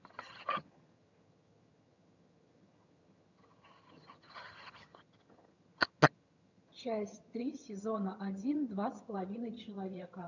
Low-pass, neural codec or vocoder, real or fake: 7.2 kHz; vocoder, 22.05 kHz, 80 mel bands, HiFi-GAN; fake